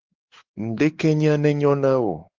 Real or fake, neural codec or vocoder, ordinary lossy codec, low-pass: fake; codec, 16 kHz, 4 kbps, X-Codec, WavLM features, trained on Multilingual LibriSpeech; Opus, 16 kbps; 7.2 kHz